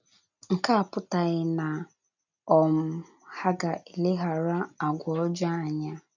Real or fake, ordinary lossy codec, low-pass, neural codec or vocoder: real; none; 7.2 kHz; none